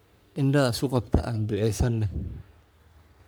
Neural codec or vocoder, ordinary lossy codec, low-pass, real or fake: codec, 44.1 kHz, 3.4 kbps, Pupu-Codec; none; none; fake